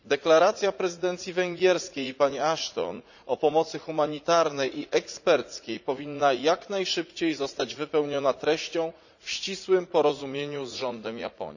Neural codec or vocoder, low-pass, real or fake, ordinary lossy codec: vocoder, 44.1 kHz, 80 mel bands, Vocos; 7.2 kHz; fake; none